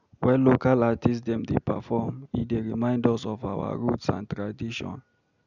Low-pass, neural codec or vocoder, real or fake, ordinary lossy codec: 7.2 kHz; none; real; none